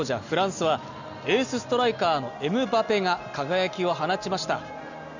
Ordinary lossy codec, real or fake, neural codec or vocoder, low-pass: none; real; none; 7.2 kHz